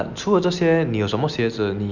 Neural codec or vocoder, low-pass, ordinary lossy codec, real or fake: none; 7.2 kHz; none; real